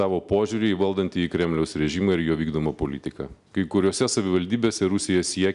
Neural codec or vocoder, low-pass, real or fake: none; 10.8 kHz; real